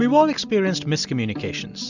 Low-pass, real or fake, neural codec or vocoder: 7.2 kHz; real; none